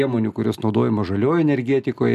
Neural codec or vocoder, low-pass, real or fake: none; 14.4 kHz; real